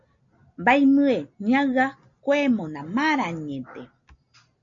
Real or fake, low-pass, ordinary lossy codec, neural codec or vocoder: real; 7.2 kHz; MP3, 48 kbps; none